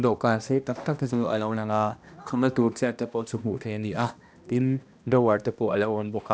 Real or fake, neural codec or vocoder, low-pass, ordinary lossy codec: fake; codec, 16 kHz, 1 kbps, X-Codec, HuBERT features, trained on balanced general audio; none; none